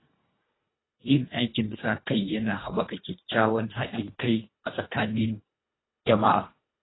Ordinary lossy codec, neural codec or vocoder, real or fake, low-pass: AAC, 16 kbps; codec, 24 kHz, 1.5 kbps, HILCodec; fake; 7.2 kHz